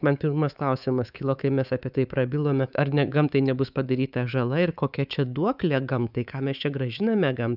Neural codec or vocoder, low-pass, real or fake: codec, 24 kHz, 3.1 kbps, DualCodec; 5.4 kHz; fake